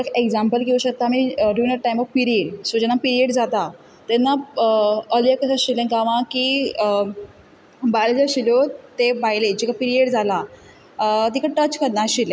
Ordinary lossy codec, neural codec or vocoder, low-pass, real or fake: none; none; none; real